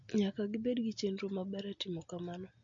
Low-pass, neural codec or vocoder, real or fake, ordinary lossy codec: 7.2 kHz; none; real; MP3, 48 kbps